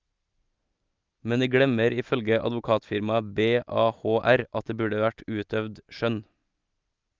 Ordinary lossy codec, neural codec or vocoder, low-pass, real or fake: Opus, 24 kbps; none; 7.2 kHz; real